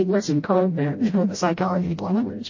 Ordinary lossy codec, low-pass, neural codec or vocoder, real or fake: MP3, 32 kbps; 7.2 kHz; codec, 16 kHz, 0.5 kbps, FreqCodec, smaller model; fake